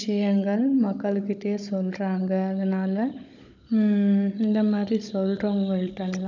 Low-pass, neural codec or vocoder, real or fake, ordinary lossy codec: 7.2 kHz; codec, 16 kHz, 16 kbps, FunCodec, trained on Chinese and English, 50 frames a second; fake; none